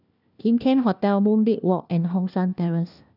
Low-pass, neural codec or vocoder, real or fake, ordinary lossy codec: 5.4 kHz; codec, 16 kHz, 1 kbps, FunCodec, trained on LibriTTS, 50 frames a second; fake; none